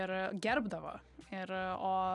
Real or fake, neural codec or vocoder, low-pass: real; none; 10.8 kHz